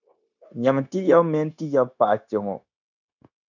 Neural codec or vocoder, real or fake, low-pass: codec, 16 kHz, 0.9 kbps, LongCat-Audio-Codec; fake; 7.2 kHz